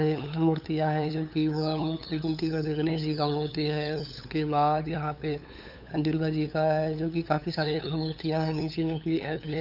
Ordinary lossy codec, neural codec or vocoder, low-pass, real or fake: none; codec, 16 kHz, 8 kbps, FunCodec, trained on LibriTTS, 25 frames a second; 5.4 kHz; fake